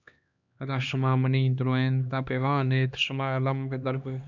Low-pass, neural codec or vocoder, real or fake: 7.2 kHz; codec, 16 kHz, 2 kbps, X-Codec, HuBERT features, trained on LibriSpeech; fake